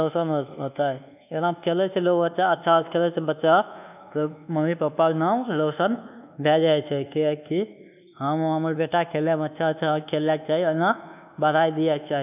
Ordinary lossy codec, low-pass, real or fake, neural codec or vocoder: none; 3.6 kHz; fake; codec, 24 kHz, 1.2 kbps, DualCodec